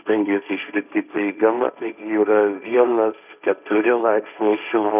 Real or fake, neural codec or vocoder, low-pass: fake; codec, 16 kHz, 1.1 kbps, Voila-Tokenizer; 3.6 kHz